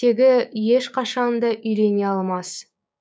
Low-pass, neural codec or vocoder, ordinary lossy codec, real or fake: none; codec, 16 kHz, 6 kbps, DAC; none; fake